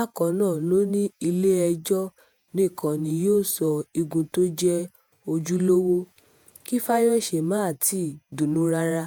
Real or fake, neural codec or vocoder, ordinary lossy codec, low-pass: fake; vocoder, 48 kHz, 128 mel bands, Vocos; none; none